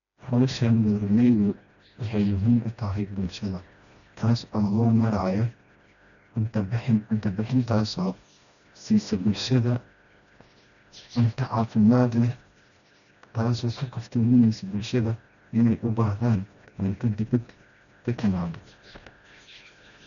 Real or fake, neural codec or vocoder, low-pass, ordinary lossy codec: fake; codec, 16 kHz, 1 kbps, FreqCodec, smaller model; 7.2 kHz; none